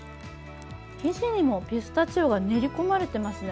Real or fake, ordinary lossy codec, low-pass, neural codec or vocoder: real; none; none; none